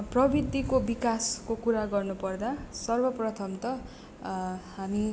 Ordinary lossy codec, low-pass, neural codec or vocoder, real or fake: none; none; none; real